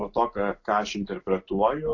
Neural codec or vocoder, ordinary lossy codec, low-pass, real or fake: none; AAC, 48 kbps; 7.2 kHz; real